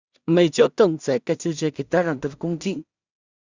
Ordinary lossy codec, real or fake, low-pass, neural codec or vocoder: Opus, 64 kbps; fake; 7.2 kHz; codec, 16 kHz in and 24 kHz out, 0.4 kbps, LongCat-Audio-Codec, two codebook decoder